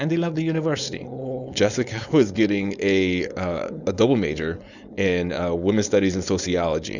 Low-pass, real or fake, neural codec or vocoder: 7.2 kHz; fake; codec, 16 kHz, 4.8 kbps, FACodec